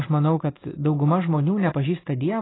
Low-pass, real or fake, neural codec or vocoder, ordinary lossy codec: 7.2 kHz; real; none; AAC, 16 kbps